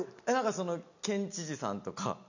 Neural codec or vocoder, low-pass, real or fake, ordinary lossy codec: none; 7.2 kHz; real; none